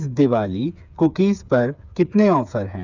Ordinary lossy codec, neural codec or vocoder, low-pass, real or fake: none; codec, 16 kHz, 8 kbps, FreqCodec, smaller model; 7.2 kHz; fake